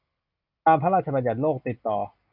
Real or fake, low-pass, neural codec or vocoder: real; 5.4 kHz; none